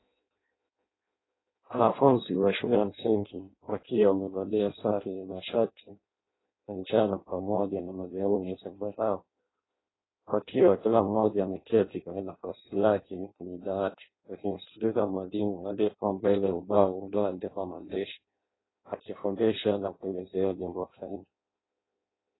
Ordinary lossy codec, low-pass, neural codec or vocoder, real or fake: AAC, 16 kbps; 7.2 kHz; codec, 16 kHz in and 24 kHz out, 0.6 kbps, FireRedTTS-2 codec; fake